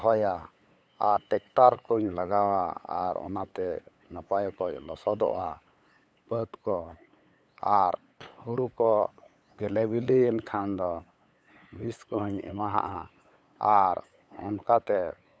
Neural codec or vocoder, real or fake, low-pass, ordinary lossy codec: codec, 16 kHz, 8 kbps, FunCodec, trained on LibriTTS, 25 frames a second; fake; none; none